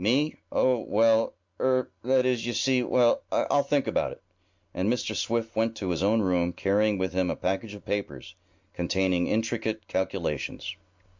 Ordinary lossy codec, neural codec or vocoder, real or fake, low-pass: MP3, 64 kbps; none; real; 7.2 kHz